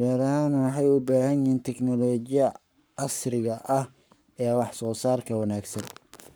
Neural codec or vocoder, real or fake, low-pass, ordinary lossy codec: codec, 44.1 kHz, 7.8 kbps, Pupu-Codec; fake; none; none